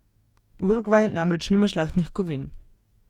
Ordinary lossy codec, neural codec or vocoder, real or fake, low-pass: Opus, 64 kbps; codec, 44.1 kHz, 2.6 kbps, DAC; fake; 19.8 kHz